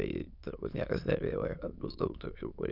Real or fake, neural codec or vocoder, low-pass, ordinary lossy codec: fake; autoencoder, 22.05 kHz, a latent of 192 numbers a frame, VITS, trained on many speakers; 5.4 kHz; Opus, 64 kbps